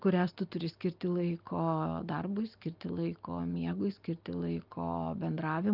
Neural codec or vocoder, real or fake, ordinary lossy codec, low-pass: none; real; Opus, 24 kbps; 5.4 kHz